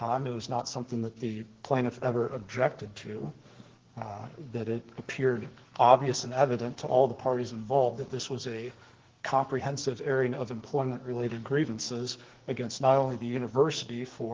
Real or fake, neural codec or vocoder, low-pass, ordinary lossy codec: fake; codec, 44.1 kHz, 2.6 kbps, SNAC; 7.2 kHz; Opus, 16 kbps